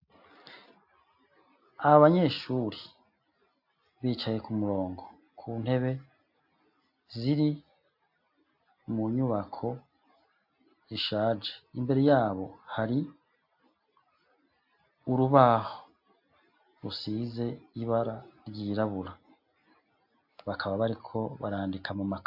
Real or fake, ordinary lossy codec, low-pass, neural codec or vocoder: real; AAC, 48 kbps; 5.4 kHz; none